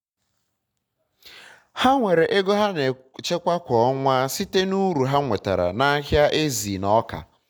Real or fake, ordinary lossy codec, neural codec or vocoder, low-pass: real; none; none; 19.8 kHz